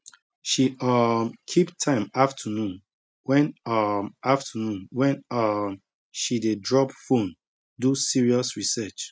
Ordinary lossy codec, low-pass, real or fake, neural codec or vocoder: none; none; real; none